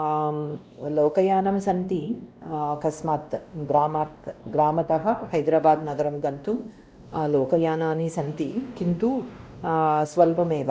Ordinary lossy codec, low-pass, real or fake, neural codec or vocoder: none; none; fake; codec, 16 kHz, 1 kbps, X-Codec, WavLM features, trained on Multilingual LibriSpeech